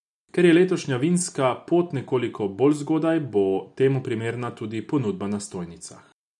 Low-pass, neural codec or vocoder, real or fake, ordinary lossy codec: 10.8 kHz; none; real; none